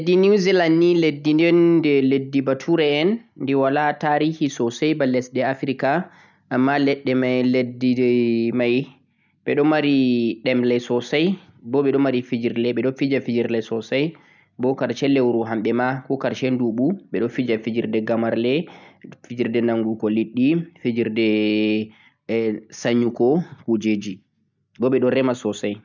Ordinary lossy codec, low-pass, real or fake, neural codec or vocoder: none; 7.2 kHz; real; none